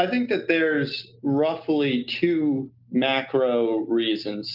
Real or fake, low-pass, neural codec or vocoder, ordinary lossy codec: real; 5.4 kHz; none; Opus, 24 kbps